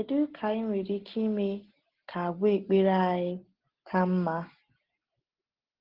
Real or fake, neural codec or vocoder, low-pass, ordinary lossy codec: real; none; 5.4 kHz; Opus, 16 kbps